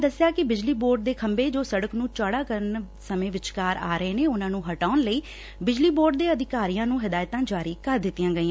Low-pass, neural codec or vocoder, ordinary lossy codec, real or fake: none; none; none; real